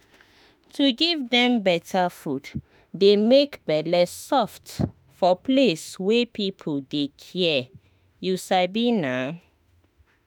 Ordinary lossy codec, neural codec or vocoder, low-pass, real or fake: none; autoencoder, 48 kHz, 32 numbers a frame, DAC-VAE, trained on Japanese speech; none; fake